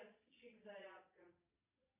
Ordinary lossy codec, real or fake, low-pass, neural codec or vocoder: AAC, 24 kbps; fake; 3.6 kHz; vocoder, 22.05 kHz, 80 mel bands, Vocos